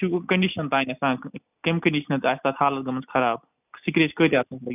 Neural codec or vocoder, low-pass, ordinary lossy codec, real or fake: none; 3.6 kHz; none; real